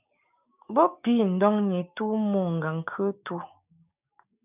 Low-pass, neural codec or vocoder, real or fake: 3.6 kHz; codec, 16 kHz, 6 kbps, DAC; fake